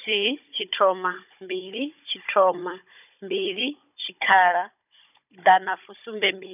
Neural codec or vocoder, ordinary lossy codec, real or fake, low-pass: codec, 16 kHz, 16 kbps, FunCodec, trained on Chinese and English, 50 frames a second; none; fake; 3.6 kHz